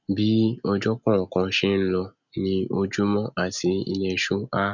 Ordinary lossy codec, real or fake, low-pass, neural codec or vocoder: none; real; 7.2 kHz; none